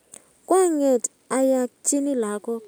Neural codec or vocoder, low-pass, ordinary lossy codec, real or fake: none; none; none; real